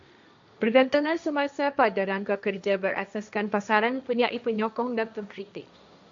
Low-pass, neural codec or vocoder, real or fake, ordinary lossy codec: 7.2 kHz; codec, 16 kHz, 1.1 kbps, Voila-Tokenizer; fake; AAC, 64 kbps